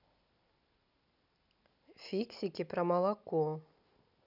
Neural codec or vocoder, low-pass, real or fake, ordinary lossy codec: none; 5.4 kHz; real; none